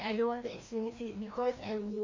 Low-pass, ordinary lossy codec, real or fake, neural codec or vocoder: 7.2 kHz; AAC, 48 kbps; fake; codec, 16 kHz, 1 kbps, FreqCodec, larger model